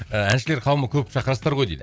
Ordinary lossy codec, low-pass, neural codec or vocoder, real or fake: none; none; none; real